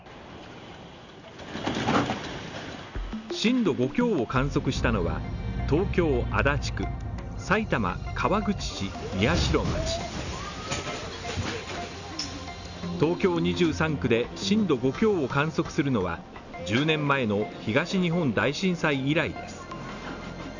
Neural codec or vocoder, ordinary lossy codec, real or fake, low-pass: none; none; real; 7.2 kHz